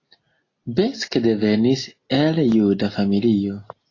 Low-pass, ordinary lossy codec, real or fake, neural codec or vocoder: 7.2 kHz; AAC, 32 kbps; real; none